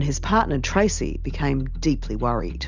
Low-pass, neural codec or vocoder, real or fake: 7.2 kHz; none; real